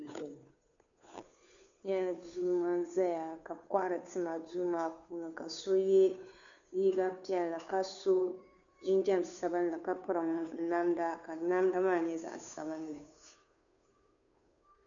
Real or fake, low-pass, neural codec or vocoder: fake; 7.2 kHz; codec, 16 kHz, 2 kbps, FunCodec, trained on Chinese and English, 25 frames a second